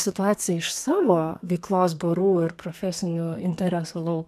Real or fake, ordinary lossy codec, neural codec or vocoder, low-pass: fake; MP3, 96 kbps; codec, 32 kHz, 1.9 kbps, SNAC; 14.4 kHz